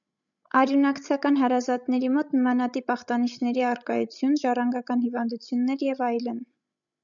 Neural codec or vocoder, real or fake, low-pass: codec, 16 kHz, 16 kbps, FreqCodec, larger model; fake; 7.2 kHz